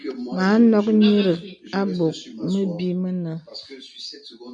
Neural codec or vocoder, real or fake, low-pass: none; real; 9.9 kHz